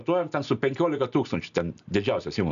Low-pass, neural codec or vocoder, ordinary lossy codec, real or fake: 7.2 kHz; none; MP3, 96 kbps; real